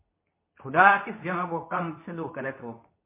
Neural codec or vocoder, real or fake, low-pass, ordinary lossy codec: codec, 24 kHz, 0.9 kbps, WavTokenizer, medium speech release version 2; fake; 3.6 kHz; MP3, 24 kbps